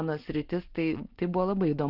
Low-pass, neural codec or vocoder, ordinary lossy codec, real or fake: 5.4 kHz; none; Opus, 16 kbps; real